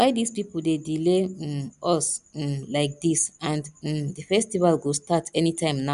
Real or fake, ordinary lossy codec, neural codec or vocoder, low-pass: real; none; none; 10.8 kHz